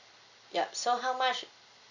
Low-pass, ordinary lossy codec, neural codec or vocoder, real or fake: 7.2 kHz; none; none; real